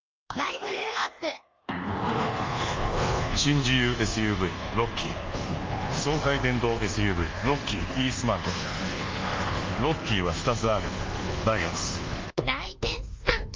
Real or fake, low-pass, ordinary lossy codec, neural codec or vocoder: fake; 7.2 kHz; Opus, 32 kbps; codec, 24 kHz, 1.2 kbps, DualCodec